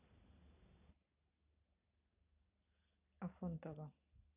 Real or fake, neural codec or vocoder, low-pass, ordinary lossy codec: real; none; 3.6 kHz; none